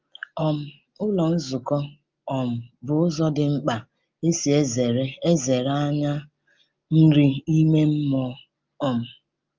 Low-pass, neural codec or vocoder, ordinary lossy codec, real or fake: 7.2 kHz; none; Opus, 24 kbps; real